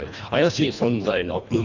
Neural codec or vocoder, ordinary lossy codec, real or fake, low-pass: codec, 24 kHz, 1.5 kbps, HILCodec; none; fake; 7.2 kHz